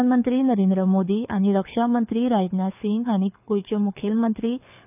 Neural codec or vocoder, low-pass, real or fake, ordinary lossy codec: codec, 24 kHz, 6 kbps, HILCodec; 3.6 kHz; fake; none